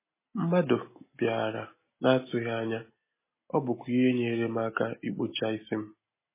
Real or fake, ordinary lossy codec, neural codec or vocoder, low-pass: real; MP3, 16 kbps; none; 3.6 kHz